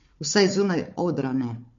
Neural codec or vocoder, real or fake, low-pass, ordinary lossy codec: codec, 16 kHz, 4 kbps, FunCodec, trained on Chinese and English, 50 frames a second; fake; 7.2 kHz; MP3, 48 kbps